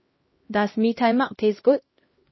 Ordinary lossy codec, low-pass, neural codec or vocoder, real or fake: MP3, 24 kbps; 7.2 kHz; codec, 16 kHz, 1 kbps, X-Codec, HuBERT features, trained on LibriSpeech; fake